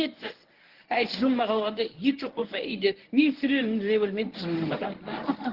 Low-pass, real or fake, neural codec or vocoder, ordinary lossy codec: 5.4 kHz; fake; codec, 24 kHz, 0.9 kbps, WavTokenizer, medium speech release version 1; Opus, 16 kbps